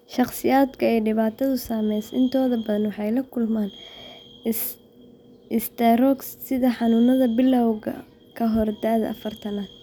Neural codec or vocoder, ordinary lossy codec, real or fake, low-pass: none; none; real; none